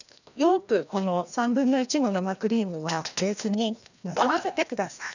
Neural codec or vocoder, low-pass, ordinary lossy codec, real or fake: codec, 16 kHz, 1 kbps, FreqCodec, larger model; 7.2 kHz; none; fake